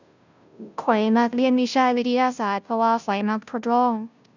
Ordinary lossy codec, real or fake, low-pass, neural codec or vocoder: none; fake; 7.2 kHz; codec, 16 kHz, 0.5 kbps, FunCodec, trained on Chinese and English, 25 frames a second